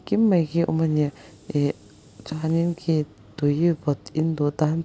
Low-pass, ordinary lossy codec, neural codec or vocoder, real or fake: none; none; none; real